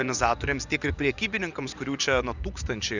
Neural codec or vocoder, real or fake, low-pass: vocoder, 24 kHz, 100 mel bands, Vocos; fake; 7.2 kHz